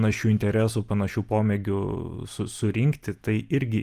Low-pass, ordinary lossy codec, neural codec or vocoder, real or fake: 14.4 kHz; Opus, 32 kbps; none; real